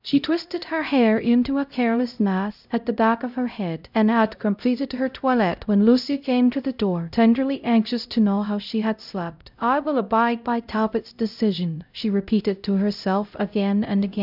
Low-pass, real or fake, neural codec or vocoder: 5.4 kHz; fake; codec, 16 kHz, 0.5 kbps, X-Codec, HuBERT features, trained on LibriSpeech